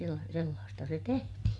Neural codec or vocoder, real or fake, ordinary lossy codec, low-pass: none; real; none; none